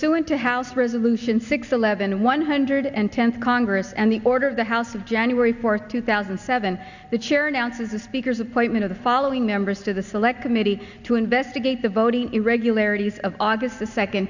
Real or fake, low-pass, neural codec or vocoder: real; 7.2 kHz; none